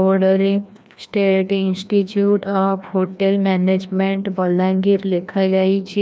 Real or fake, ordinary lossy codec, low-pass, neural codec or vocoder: fake; none; none; codec, 16 kHz, 1 kbps, FreqCodec, larger model